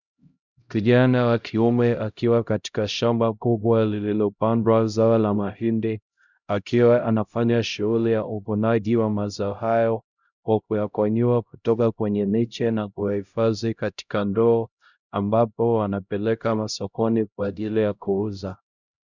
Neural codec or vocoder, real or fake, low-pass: codec, 16 kHz, 0.5 kbps, X-Codec, HuBERT features, trained on LibriSpeech; fake; 7.2 kHz